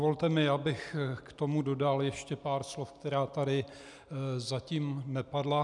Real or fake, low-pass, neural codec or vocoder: real; 10.8 kHz; none